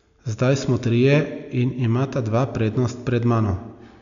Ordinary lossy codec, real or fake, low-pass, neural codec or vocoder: none; real; 7.2 kHz; none